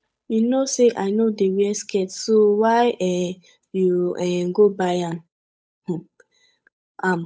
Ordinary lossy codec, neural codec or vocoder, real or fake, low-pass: none; codec, 16 kHz, 8 kbps, FunCodec, trained on Chinese and English, 25 frames a second; fake; none